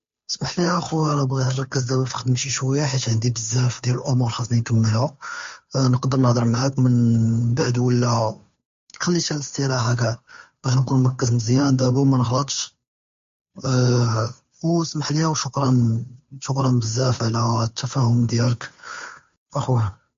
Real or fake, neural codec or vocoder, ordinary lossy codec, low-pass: fake; codec, 16 kHz, 2 kbps, FunCodec, trained on Chinese and English, 25 frames a second; MP3, 48 kbps; 7.2 kHz